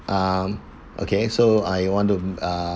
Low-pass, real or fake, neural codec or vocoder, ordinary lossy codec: none; real; none; none